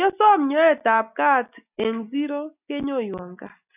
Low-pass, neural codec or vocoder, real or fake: 3.6 kHz; none; real